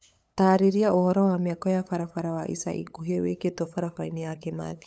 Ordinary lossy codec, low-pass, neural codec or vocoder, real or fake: none; none; codec, 16 kHz, 8 kbps, FunCodec, trained on LibriTTS, 25 frames a second; fake